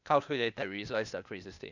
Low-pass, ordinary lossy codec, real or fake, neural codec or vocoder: 7.2 kHz; none; fake; codec, 16 kHz, 0.8 kbps, ZipCodec